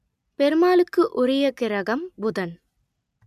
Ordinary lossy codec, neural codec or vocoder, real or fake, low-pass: none; none; real; 14.4 kHz